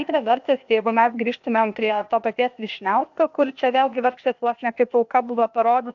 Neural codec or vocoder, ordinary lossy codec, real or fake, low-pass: codec, 16 kHz, 0.8 kbps, ZipCodec; AAC, 64 kbps; fake; 7.2 kHz